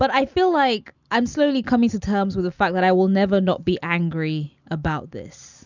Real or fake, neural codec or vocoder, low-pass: real; none; 7.2 kHz